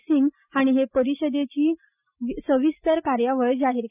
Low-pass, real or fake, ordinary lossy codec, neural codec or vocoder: 3.6 kHz; real; none; none